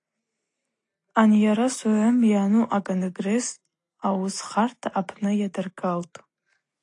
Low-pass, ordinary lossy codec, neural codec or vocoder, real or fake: 10.8 kHz; AAC, 48 kbps; none; real